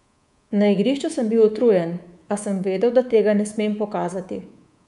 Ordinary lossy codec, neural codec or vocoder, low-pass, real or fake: none; codec, 24 kHz, 3.1 kbps, DualCodec; 10.8 kHz; fake